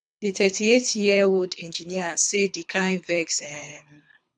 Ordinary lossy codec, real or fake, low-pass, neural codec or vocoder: none; fake; 9.9 kHz; codec, 24 kHz, 3 kbps, HILCodec